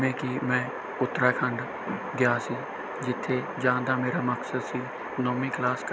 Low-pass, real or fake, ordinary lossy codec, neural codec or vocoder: none; real; none; none